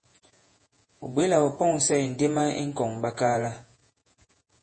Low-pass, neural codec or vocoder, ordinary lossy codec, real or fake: 9.9 kHz; vocoder, 48 kHz, 128 mel bands, Vocos; MP3, 32 kbps; fake